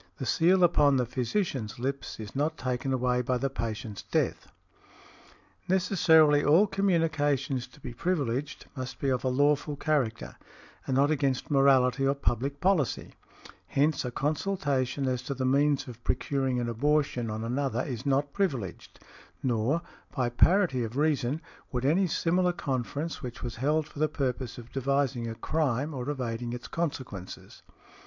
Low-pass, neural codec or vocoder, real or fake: 7.2 kHz; none; real